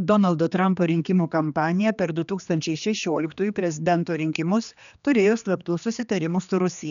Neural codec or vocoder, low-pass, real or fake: codec, 16 kHz, 2 kbps, X-Codec, HuBERT features, trained on general audio; 7.2 kHz; fake